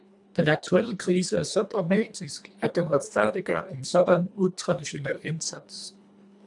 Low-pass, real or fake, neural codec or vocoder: 10.8 kHz; fake; codec, 24 kHz, 1.5 kbps, HILCodec